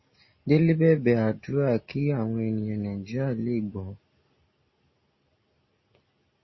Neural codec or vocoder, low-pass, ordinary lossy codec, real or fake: none; 7.2 kHz; MP3, 24 kbps; real